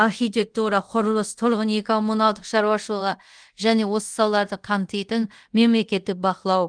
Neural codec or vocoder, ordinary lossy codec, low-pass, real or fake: codec, 24 kHz, 0.5 kbps, DualCodec; Opus, 24 kbps; 9.9 kHz; fake